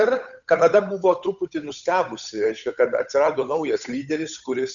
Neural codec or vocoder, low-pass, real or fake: codec, 16 kHz, 8 kbps, FunCodec, trained on Chinese and English, 25 frames a second; 7.2 kHz; fake